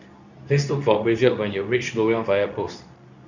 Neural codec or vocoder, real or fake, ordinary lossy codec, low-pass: codec, 24 kHz, 0.9 kbps, WavTokenizer, medium speech release version 2; fake; none; 7.2 kHz